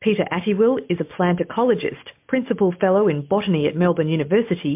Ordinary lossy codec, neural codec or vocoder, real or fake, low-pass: MP3, 32 kbps; none; real; 3.6 kHz